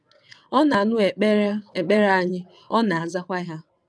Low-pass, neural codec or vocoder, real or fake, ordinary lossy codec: none; vocoder, 22.05 kHz, 80 mel bands, WaveNeXt; fake; none